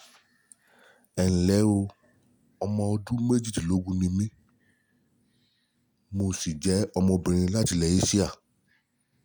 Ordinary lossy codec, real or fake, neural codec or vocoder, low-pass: none; real; none; none